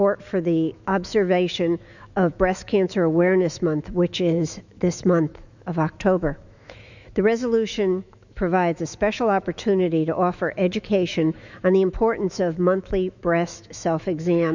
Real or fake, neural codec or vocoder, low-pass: fake; autoencoder, 48 kHz, 128 numbers a frame, DAC-VAE, trained on Japanese speech; 7.2 kHz